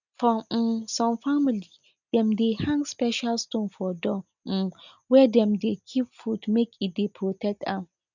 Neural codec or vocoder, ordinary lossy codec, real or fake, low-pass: none; none; real; 7.2 kHz